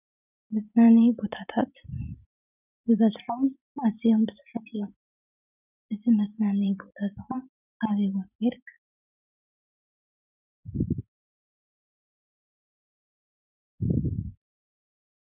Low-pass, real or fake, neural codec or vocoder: 3.6 kHz; fake; vocoder, 44.1 kHz, 128 mel bands every 512 samples, BigVGAN v2